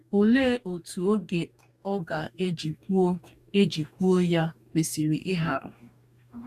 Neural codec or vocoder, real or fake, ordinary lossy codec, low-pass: codec, 44.1 kHz, 2.6 kbps, DAC; fake; none; 14.4 kHz